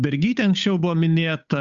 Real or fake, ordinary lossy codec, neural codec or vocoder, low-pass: fake; Opus, 64 kbps; codec, 16 kHz, 8 kbps, FunCodec, trained on Chinese and English, 25 frames a second; 7.2 kHz